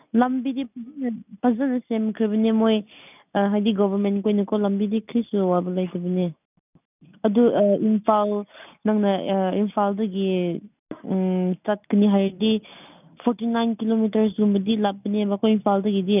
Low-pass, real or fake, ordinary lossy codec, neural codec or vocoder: 3.6 kHz; real; none; none